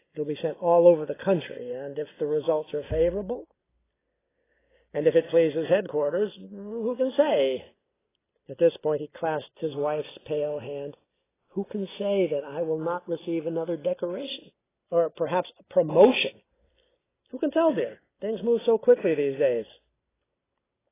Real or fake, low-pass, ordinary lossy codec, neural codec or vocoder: real; 3.6 kHz; AAC, 16 kbps; none